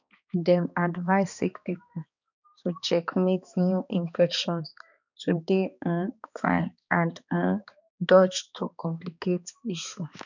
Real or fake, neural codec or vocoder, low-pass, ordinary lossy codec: fake; codec, 16 kHz, 2 kbps, X-Codec, HuBERT features, trained on balanced general audio; 7.2 kHz; none